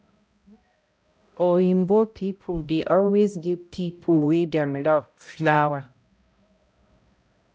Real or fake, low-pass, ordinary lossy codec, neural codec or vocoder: fake; none; none; codec, 16 kHz, 0.5 kbps, X-Codec, HuBERT features, trained on balanced general audio